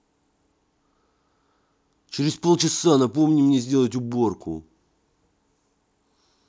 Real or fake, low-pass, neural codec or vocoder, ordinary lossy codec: real; none; none; none